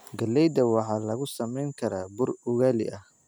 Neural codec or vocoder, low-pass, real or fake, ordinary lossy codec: none; none; real; none